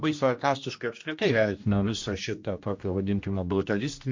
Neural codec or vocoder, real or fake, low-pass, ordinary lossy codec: codec, 16 kHz, 1 kbps, X-Codec, HuBERT features, trained on general audio; fake; 7.2 kHz; MP3, 48 kbps